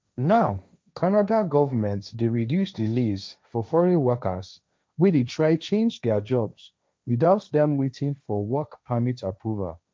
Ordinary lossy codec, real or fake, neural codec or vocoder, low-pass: none; fake; codec, 16 kHz, 1.1 kbps, Voila-Tokenizer; none